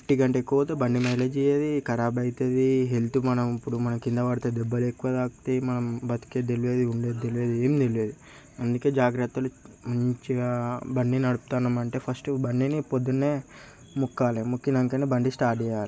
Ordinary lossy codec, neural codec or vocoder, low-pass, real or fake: none; none; none; real